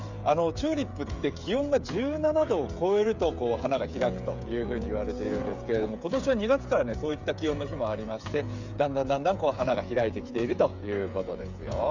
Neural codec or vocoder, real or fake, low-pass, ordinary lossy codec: codec, 16 kHz, 8 kbps, FreqCodec, smaller model; fake; 7.2 kHz; none